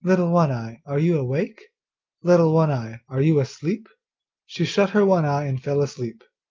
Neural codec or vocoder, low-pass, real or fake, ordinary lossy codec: none; 7.2 kHz; real; Opus, 24 kbps